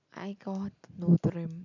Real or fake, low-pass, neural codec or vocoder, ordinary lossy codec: real; 7.2 kHz; none; none